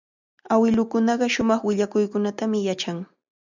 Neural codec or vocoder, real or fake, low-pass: none; real; 7.2 kHz